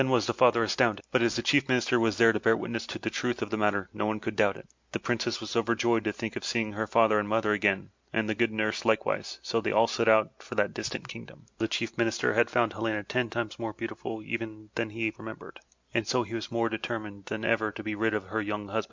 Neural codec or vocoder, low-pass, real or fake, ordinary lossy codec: none; 7.2 kHz; real; MP3, 64 kbps